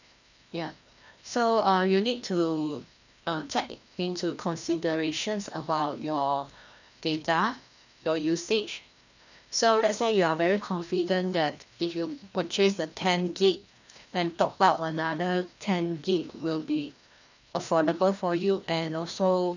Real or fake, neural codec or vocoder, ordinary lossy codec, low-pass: fake; codec, 16 kHz, 1 kbps, FreqCodec, larger model; none; 7.2 kHz